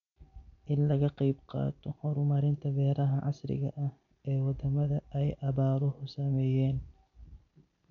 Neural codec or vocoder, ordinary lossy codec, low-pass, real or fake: none; none; 7.2 kHz; real